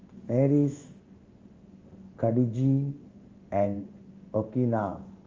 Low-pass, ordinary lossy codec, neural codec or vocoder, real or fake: 7.2 kHz; Opus, 32 kbps; codec, 16 kHz in and 24 kHz out, 1 kbps, XY-Tokenizer; fake